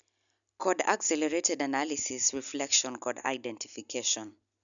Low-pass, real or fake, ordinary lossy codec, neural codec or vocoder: 7.2 kHz; real; none; none